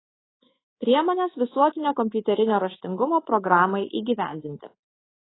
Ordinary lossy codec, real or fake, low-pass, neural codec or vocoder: AAC, 16 kbps; fake; 7.2 kHz; codec, 24 kHz, 3.1 kbps, DualCodec